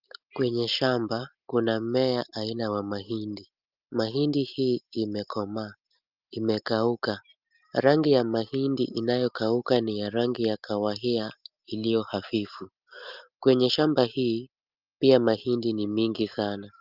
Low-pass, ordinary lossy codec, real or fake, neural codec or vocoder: 5.4 kHz; Opus, 32 kbps; real; none